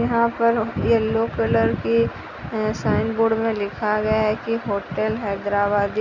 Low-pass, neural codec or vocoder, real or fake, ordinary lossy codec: 7.2 kHz; none; real; none